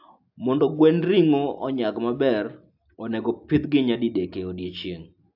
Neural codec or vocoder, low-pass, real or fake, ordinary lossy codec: none; 5.4 kHz; real; AAC, 48 kbps